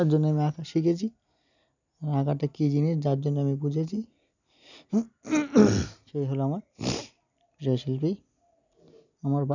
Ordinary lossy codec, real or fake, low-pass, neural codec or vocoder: none; real; 7.2 kHz; none